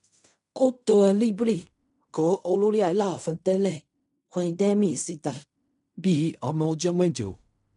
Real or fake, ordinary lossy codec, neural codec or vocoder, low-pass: fake; none; codec, 16 kHz in and 24 kHz out, 0.4 kbps, LongCat-Audio-Codec, fine tuned four codebook decoder; 10.8 kHz